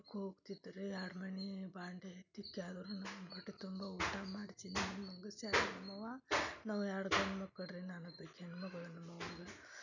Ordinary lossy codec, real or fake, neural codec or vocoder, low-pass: none; real; none; 7.2 kHz